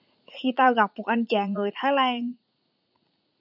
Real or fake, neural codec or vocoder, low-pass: fake; vocoder, 44.1 kHz, 80 mel bands, Vocos; 5.4 kHz